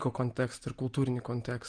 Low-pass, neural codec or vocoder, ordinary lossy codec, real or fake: 9.9 kHz; none; Opus, 24 kbps; real